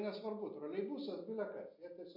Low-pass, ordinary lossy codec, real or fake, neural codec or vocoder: 5.4 kHz; MP3, 32 kbps; real; none